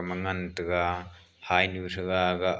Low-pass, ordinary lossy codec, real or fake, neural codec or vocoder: none; none; real; none